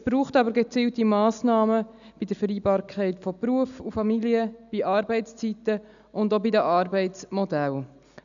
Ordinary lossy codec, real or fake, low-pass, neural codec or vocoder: none; real; 7.2 kHz; none